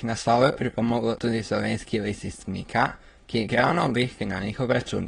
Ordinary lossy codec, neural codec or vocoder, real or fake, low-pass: AAC, 32 kbps; autoencoder, 22.05 kHz, a latent of 192 numbers a frame, VITS, trained on many speakers; fake; 9.9 kHz